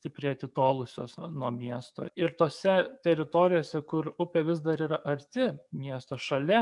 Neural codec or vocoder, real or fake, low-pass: codec, 44.1 kHz, 7.8 kbps, DAC; fake; 10.8 kHz